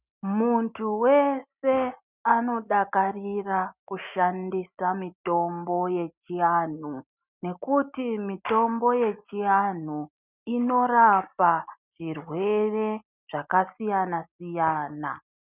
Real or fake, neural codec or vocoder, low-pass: real; none; 3.6 kHz